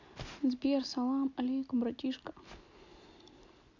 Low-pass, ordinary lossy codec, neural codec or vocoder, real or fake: 7.2 kHz; none; none; real